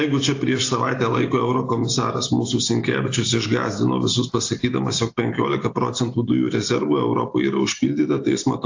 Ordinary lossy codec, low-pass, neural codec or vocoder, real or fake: AAC, 48 kbps; 7.2 kHz; none; real